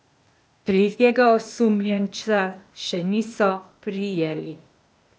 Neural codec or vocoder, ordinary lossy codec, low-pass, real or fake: codec, 16 kHz, 0.8 kbps, ZipCodec; none; none; fake